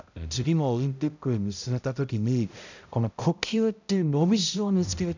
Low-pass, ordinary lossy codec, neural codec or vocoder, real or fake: 7.2 kHz; none; codec, 16 kHz, 0.5 kbps, X-Codec, HuBERT features, trained on balanced general audio; fake